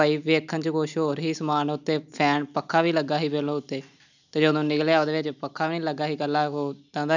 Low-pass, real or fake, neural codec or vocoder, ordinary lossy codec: 7.2 kHz; real; none; none